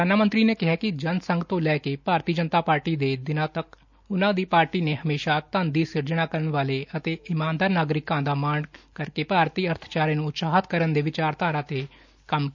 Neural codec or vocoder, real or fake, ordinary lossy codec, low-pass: none; real; none; 7.2 kHz